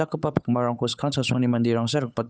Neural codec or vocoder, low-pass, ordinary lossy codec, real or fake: codec, 16 kHz, 8 kbps, FunCodec, trained on Chinese and English, 25 frames a second; none; none; fake